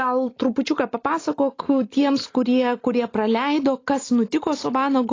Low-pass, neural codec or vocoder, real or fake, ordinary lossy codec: 7.2 kHz; none; real; AAC, 32 kbps